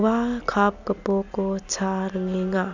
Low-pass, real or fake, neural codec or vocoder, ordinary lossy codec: 7.2 kHz; real; none; none